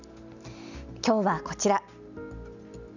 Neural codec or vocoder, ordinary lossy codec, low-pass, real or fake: none; none; 7.2 kHz; real